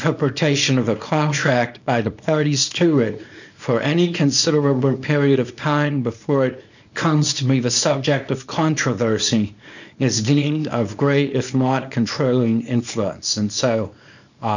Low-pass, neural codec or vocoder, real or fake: 7.2 kHz; codec, 24 kHz, 0.9 kbps, WavTokenizer, small release; fake